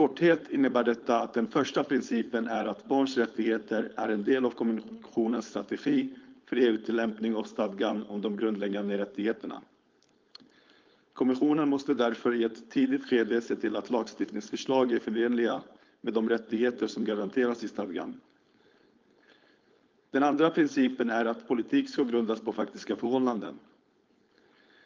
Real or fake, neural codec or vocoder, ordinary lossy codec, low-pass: fake; codec, 16 kHz, 4.8 kbps, FACodec; Opus, 24 kbps; 7.2 kHz